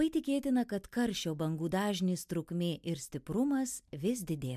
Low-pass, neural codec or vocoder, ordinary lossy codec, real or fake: 14.4 kHz; none; MP3, 96 kbps; real